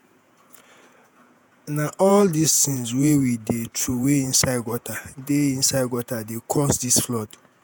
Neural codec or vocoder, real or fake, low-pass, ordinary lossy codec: vocoder, 48 kHz, 128 mel bands, Vocos; fake; none; none